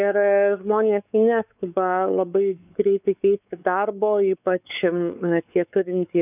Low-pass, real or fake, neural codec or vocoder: 3.6 kHz; fake; codec, 16 kHz, 4 kbps, X-Codec, WavLM features, trained on Multilingual LibriSpeech